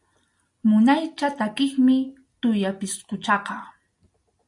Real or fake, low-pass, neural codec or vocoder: real; 10.8 kHz; none